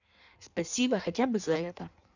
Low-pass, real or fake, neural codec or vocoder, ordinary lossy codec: 7.2 kHz; fake; codec, 16 kHz in and 24 kHz out, 1.1 kbps, FireRedTTS-2 codec; none